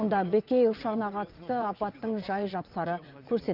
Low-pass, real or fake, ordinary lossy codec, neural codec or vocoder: 5.4 kHz; fake; Opus, 24 kbps; vocoder, 22.05 kHz, 80 mel bands, WaveNeXt